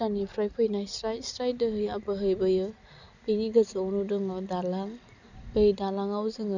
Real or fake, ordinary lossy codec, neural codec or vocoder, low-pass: real; none; none; 7.2 kHz